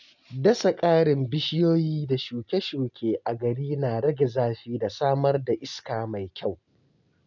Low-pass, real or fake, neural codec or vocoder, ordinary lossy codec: 7.2 kHz; real; none; none